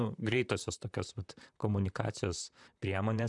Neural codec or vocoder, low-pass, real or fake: vocoder, 44.1 kHz, 128 mel bands, Pupu-Vocoder; 10.8 kHz; fake